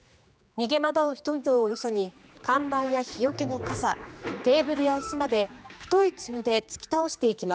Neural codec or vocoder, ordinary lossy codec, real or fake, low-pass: codec, 16 kHz, 2 kbps, X-Codec, HuBERT features, trained on general audio; none; fake; none